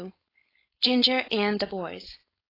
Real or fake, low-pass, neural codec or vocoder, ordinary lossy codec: fake; 5.4 kHz; codec, 16 kHz, 4.8 kbps, FACodec; AAC, 24 kbps